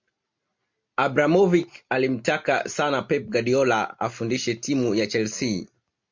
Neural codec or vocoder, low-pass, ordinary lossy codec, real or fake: none; 7.2 kHz; MP3, 48 kbps; real